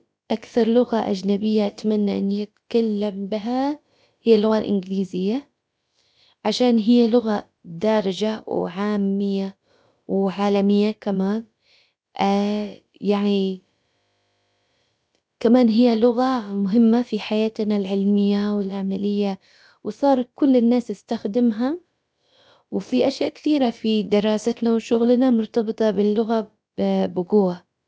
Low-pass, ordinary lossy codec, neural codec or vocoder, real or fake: none; none; codec, 16 kHz, about 1 kbps, DyCAST, with the encoder's durations; fake